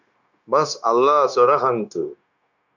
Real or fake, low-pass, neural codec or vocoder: fake; 7.2 kHz; codec, 16 kHz, 0.9 kbps, LongCat-Audio-Codec